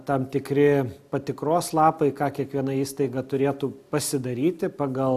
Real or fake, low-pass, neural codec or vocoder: real; 14.4 kHz; none